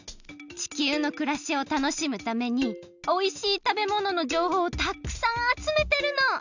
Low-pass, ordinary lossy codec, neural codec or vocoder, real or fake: 7.2 kHz; none; none; real